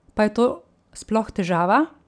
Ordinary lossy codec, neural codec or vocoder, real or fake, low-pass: none; none; real; 9.9 kHz